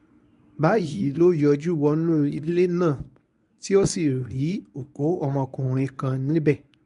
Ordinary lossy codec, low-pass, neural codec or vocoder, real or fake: none; 10.8 kHz; codec, 24 kHz, 0.9 kbps, WavTokenizer, medium speech release version 1; fake